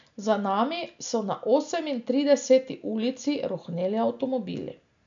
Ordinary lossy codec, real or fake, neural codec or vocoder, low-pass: MP3, 96 kbps; real; none; 7.2 kHz